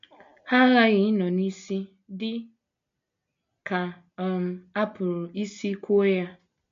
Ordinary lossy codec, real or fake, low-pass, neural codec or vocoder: MP3, 48 kbps; real; 7.2 kHz; none